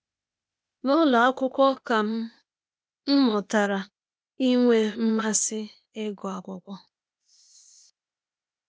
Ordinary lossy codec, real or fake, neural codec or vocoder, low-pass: none; fake; codec, 16 kHz, 0.8 kbps, ZipCodec; none